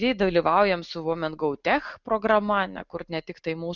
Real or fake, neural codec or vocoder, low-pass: real; none; 7.2 kHz